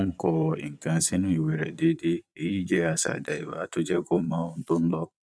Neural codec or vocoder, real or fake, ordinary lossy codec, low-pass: vocoder, 22.05 kHz, 80 mel bands, Vocos; fake; none; none